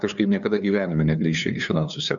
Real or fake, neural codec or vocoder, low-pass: fake; codec, 16 kHz, 2 kbps, FunCodec, trained on LibriTTS, 25 frames a second; 7.2 kHz